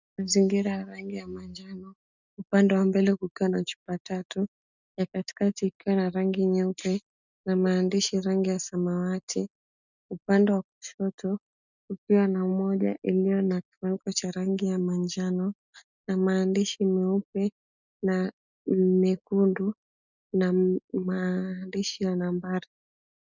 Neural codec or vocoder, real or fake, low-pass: none; real; 7.2 kHz